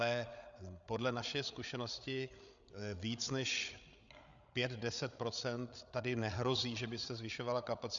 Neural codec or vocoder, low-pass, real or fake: codec, 16 kHz, 8 kbps, FreqCodec, larger model; 7.2 kHz; fake